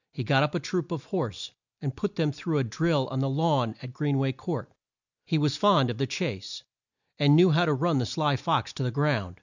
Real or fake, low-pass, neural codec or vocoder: real; 7.2 kHz; none